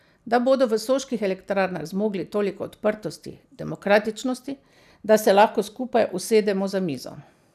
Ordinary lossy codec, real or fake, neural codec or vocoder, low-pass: none; real; none; 14.4 kHz